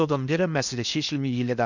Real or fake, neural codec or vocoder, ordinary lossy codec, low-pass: fake; codec, 16 kHz in and 24 kHz out, 0.8 kbps, FocalCodec, streaming, 65536 codes; none; 7.2 kHz